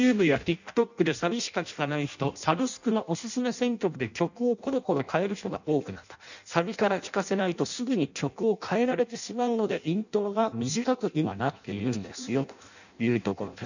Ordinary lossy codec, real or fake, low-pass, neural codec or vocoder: none; fake; 7.2 kHz; codec, 16 kHz in and 24 kHz out, 0.6 kbps, FireRedTTS-2 codec